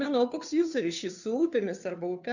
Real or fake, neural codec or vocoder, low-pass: fake; codec, 16 kHz, 2 kbps, FunCodec, trained on Chinese and English, 25 frames a second; 7.2 kHz